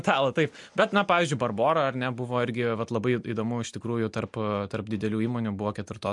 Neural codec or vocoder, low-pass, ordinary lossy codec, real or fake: none; 10.8 kHz; MP3, 64 kbps; real